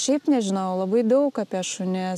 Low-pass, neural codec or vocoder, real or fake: 14.4 kHz; none; real